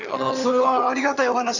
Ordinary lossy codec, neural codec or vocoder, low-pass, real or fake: none; vocoder, 22.05 kHz, 80 mel bands, HiFi-GAN; 7.2 kHz; fake